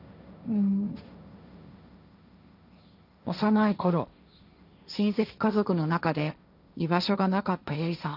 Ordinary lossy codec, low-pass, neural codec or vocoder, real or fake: none; 5.4 kHz; codec, 16 kHz, 1.1 kbps, Voila-Tokenizer; fake